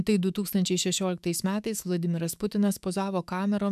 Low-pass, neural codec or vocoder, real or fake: 14.4 kHz; autoencoder, 48 kHz, 128 numbers a frame, DAC-VAE, trained on Japanese speech; fake